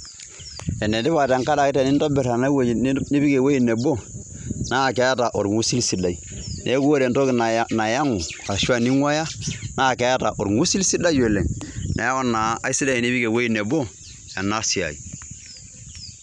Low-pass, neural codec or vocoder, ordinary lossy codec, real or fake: 10.8 kHz; none; none; real